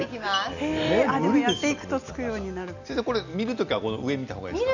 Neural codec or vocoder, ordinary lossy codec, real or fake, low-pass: none; none; real; 7.2 kHz